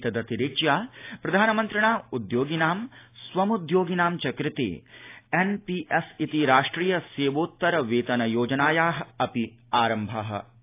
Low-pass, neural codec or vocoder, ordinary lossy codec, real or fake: 3.6 kHz; none; AAC, 24 kbps; real